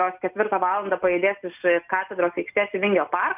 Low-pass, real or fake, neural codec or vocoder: 3.6 kHz; real; none